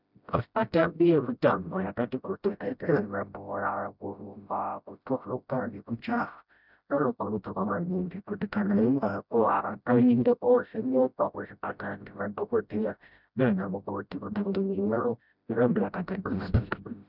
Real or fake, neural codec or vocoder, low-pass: fake; codec, 16 kHz, 0.5 kbps, FreqCodec, smaller model; 5.4 kHz